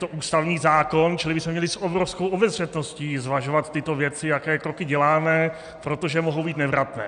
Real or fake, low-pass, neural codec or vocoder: real; 9.9 kHz; none